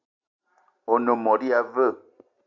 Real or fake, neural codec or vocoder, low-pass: real; none; 7.2 kHz